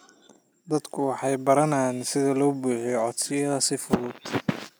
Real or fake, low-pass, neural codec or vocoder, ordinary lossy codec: real; none; none; none